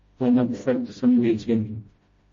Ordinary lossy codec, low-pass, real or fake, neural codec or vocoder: MP3, 32 kbps; 7.2 kHz; fake; codec, 16 kHz, 0.5 kbps, FreqCodec, smaller model